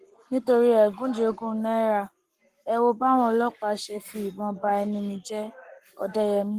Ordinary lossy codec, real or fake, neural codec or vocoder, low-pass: Opus, 24 kbps; fake; codec, 44.1 kHz, 7.8 kbps, Pupu-Codec; 14.4 kHz